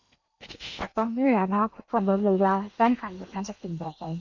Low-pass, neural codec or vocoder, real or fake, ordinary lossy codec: 7.2 kHz; codec, 16 kHz in and 24 kHz out, 0.8 kbps, FocalCodec, streaming, 65536 codes; fake; none